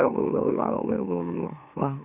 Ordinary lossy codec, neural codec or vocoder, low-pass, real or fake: none; autoencoder, 44.1 kHz, a latent of 192 numbers a frame, MeloTTS; 3.6 kHz; fake